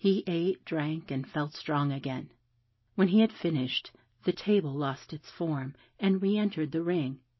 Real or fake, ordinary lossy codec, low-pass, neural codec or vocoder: real; MP3, 24 kbps; 7.2 kHz; none